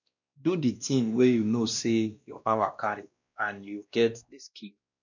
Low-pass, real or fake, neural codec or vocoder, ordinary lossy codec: 7.2 kHz; fake; codec, 16 kHz, 1 kbps, X-Codec, WavLM features, trained on Multilingual LibriSpeech; none